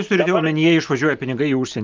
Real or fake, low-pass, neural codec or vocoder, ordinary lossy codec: real; 7.2 kHz; none; Opus, 24 kbps